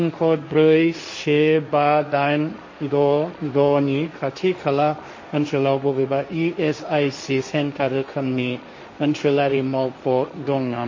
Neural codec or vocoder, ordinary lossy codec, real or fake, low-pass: codec, 16 kHz, 1.1 kbps, Voila-Tokenizer; MP3, 32 kbps; fake; 7.2 kHz